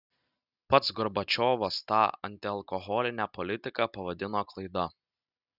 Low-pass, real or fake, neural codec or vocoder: 5.4 kHz; real; none